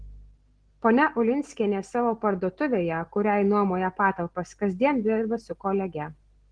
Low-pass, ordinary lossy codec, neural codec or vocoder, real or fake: 9.9 kHz; Opus, 16 kbps; none; real